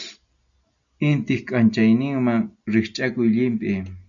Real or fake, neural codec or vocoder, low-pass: real; none; 7.2 kHz